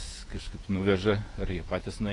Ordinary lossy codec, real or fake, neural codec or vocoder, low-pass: AAC, 32 kbps; fake; vocoder, 48 kHz, 128 mel bands, Vocos; 10.8 kHz